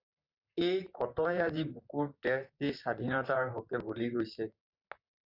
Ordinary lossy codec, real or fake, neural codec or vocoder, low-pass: Opus, 64 kbps; fake; vocoder, 44.1 kHz, 128 mel bands, Pupu-Vocoder; 5.4 kHz